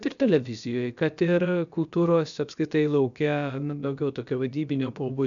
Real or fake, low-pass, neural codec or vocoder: fake; 7.2 kHz; codec, 16 kHz, about 1 kbps, DyCAST, with the encoder's durations